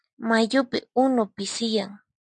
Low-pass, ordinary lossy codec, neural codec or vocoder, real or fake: 9.9 kHz; MP3, 96 kbps; none; real